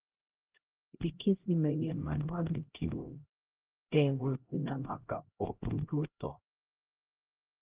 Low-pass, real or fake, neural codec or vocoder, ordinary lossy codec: 3.6 kHz; fake; codec, 16 kHz, 0.5 kbps, X-Codec, HuBERT features, trained on LibriSpeech; Opus, 16 kbps